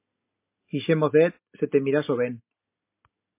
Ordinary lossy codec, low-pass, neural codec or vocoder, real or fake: MP3, 24 kbps; 3.6 kHz; none; real